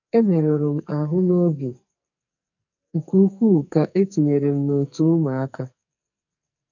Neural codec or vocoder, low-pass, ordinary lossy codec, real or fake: codec, 44.1 kHz, 2.6 kbps, SNAC; 7.2 kHz; none; fake